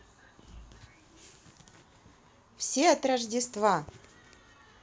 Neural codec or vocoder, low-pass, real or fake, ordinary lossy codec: none; none; real; none